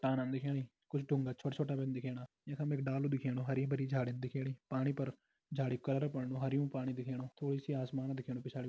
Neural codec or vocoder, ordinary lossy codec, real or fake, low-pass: none; none; real; none